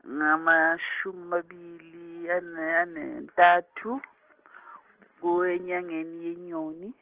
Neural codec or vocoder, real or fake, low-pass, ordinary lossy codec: none; real; 3.6 kHz; Opus, 16 kbps